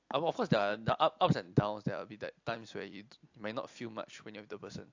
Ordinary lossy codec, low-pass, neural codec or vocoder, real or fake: AAC, 48 kbps; 7.2 kHz; none; real